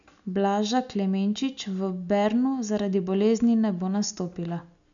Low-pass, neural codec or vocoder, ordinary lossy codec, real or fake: 7.2 kHz; none; none; real